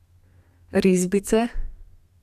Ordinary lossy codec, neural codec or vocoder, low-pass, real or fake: none; codec, 32 kHz, 1.9 kbps, SNAC; 14.4 kHz; fake